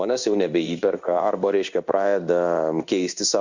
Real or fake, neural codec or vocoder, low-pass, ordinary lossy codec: fake; codec, 16 kHz in and 24 kHz out, 1 kbps, XY-Tokenizer; 7.2 kHz; Opus, 64 kbps